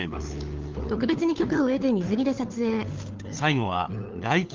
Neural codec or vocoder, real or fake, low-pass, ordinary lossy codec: codec, 16 kHz, 4 kbps, FunCodec, trained on LibriTTS, 50 frames a second; fake; 7.2 kHz; Opus, 32 kbps